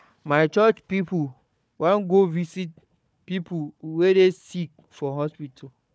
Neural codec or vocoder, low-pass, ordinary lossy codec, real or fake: codec, 16 kHz, 4 kbps, FunCodec, trained on Chinese and English, 50 frames a second; none; none; fake